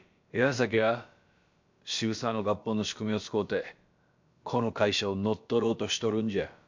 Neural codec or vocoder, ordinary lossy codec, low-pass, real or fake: codec, 16 kHz, about 1 kbps, DyCAST, with the encoder's durations; MP3, 64 kbps; 7.2 kHz; fake